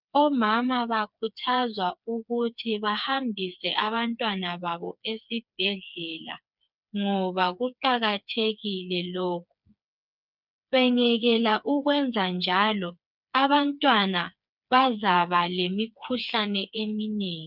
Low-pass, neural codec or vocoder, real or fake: 5.4 kHz; codec, 16 kHz, 4 kbps, FreqCodec, smaller model; fake